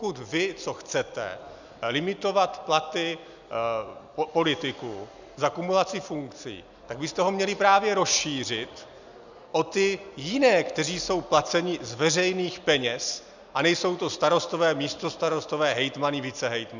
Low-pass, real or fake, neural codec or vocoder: 7.2 kHz; real; none